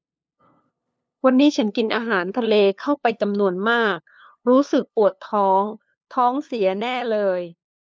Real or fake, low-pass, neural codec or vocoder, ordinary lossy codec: fake; none; codec, 16 kHz, 2 kbps, FunCodec, trained on LibriTTS, 25 frames a second; none